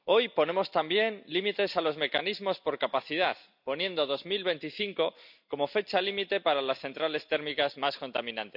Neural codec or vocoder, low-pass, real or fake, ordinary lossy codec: none; 5.4 kHz; real; none